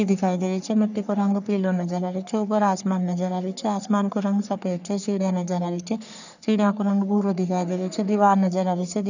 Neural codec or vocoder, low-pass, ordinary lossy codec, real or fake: codec, 44.1 kHz, 3.4 kbps, Pupu-Codec; 7.2 kHz; none; fake